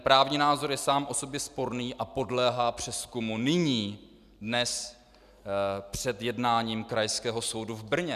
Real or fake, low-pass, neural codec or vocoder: real; 14.4 kHz; none